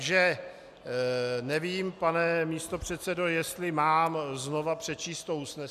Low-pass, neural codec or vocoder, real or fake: 14.4 kHz; none; real